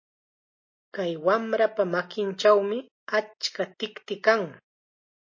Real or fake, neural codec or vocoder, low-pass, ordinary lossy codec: real; none; 7.2 kHz; MP3, 32 kbps